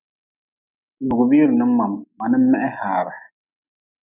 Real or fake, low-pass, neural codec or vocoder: real; 3.6 kHz; none